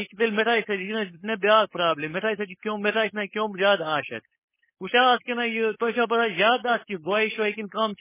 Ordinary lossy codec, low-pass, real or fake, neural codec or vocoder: MP3, 16 kbps; 3.6 kHz; fake; codec, 16 kHz, 4.8 kbps, FACodec